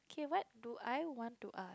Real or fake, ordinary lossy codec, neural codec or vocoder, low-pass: real; none; none; none